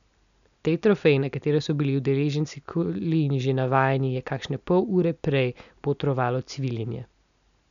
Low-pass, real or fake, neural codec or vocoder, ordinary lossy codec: 7.2 kHz; real; none; none